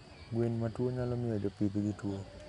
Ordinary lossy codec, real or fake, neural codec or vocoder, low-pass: none; real; none; 10.8 kHz